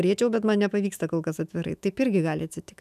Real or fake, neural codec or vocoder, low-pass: fake; autoencoder, 48 kHz, 128 numbers a frame, DAC-VAE, trained on Japanese speech; 14.4 kHz